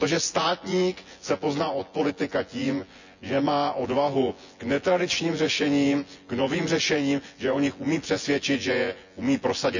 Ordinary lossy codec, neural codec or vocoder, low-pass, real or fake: none; vocoder, 24 kHz, 100 mel bands, Vocos; 7.2 kHz; fake